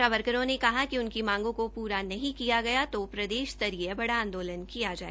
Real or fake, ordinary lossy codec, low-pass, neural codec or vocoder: real; none; none; none